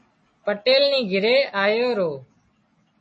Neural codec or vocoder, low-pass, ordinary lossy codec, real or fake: none; 10.8 kHz; MP3, 32 kbps; real